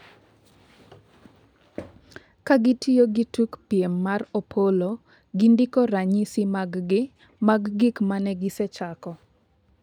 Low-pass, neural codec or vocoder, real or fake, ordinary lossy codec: 19.8 kHz; none; real; none